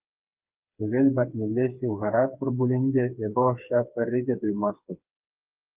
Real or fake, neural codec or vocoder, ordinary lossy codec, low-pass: fake; codec, 16 kHz, 4 kbps, FreqCodec, smaller model; Opus, 24 kbps; 3.6 kHz